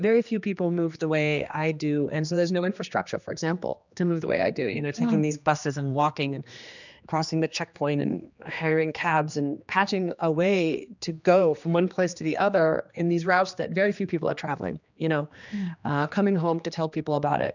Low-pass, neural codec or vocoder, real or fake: 7.2 kHz; codec, 16 kHz, 2 kbps, X-Codec, HuBERT features, trained on general audio; fake